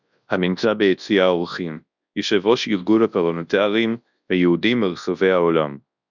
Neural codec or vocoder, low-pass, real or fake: codec, 24 kHz, 0.9 kbps, WavTokenizer, large speech release; 7.2 kHz; fake